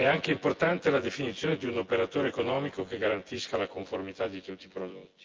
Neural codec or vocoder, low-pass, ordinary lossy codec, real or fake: vocoder, 24 kHz, 100 mel bands, Vocos; 7.2 kHz; Opus, 32 kbps; fake